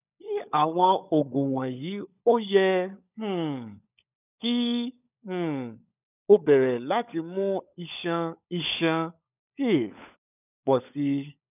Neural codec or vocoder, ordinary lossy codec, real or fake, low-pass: codec, 16 kHz, 16 kbps, FunCodec, trained on LibriTTS, 50 frames a second; none; fake; 3.6 kHz